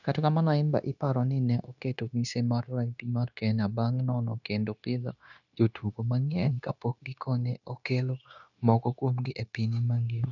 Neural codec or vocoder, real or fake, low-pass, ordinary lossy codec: codec, 16 kHz, 0.9 kbps, LongCat-Audio-Codec; fake; 7.2 kHz; none